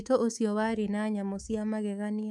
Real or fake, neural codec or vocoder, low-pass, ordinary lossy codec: fake; codec, 24 kHz, 3.1 kbps, DualCodec; none; none